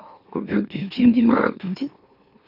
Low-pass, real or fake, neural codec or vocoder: 5.4 kHz; fake; autoencoder, 44.1 kHz, a latent of 192 numbers a frame, MeloTTS